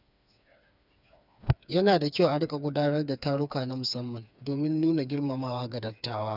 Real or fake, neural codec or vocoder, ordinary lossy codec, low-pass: fake; codec, 16 kHz, 4 kbps, FreqCodec, smaller model; none; 5.4 kHz